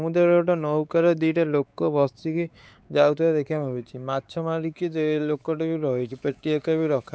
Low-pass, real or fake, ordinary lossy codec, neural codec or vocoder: none; fake; none; codec, 16 kHz, 4 kbps, X-Codec, WavLM features, trained on Multilingual LibriSpeech